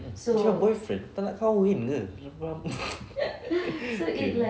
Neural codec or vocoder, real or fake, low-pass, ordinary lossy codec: none; real; none; none